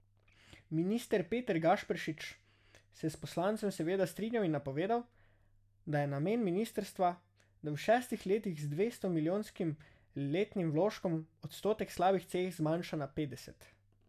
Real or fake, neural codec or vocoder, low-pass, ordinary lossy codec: real; none; 14.4 kHz; none